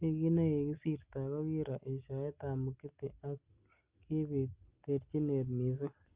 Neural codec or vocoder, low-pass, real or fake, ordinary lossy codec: none; 3.6 kHz; real; Opus, 32 kbps